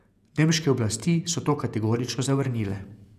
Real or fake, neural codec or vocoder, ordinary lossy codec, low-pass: fake; vocoder, 48 kHz, 128 mel bands, Vocos; none; 14.4 kHz